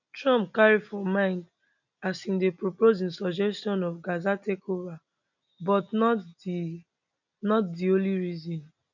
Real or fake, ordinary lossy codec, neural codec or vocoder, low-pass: real; none; none; 7.2 kHz